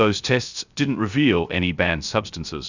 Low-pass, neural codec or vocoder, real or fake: 7.2 kHz; codec, 16 kHz, 0.3 kbps, FocalCodec; fake